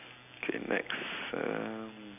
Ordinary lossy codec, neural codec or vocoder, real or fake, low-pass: none; none; real; 3.6 kHz